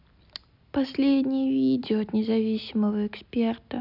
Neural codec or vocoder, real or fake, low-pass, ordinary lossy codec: none; real; 5.4 kHz; none